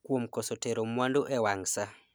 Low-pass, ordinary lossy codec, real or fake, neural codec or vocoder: none; none; real; none